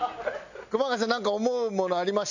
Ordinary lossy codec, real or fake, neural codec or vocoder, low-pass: none; real; none; 7.2 kHz